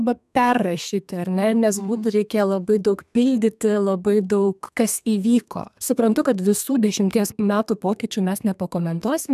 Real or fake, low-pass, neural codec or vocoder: fake; 14.4 kHz; codec, 32 kHz, 1.9 kbps, SNAC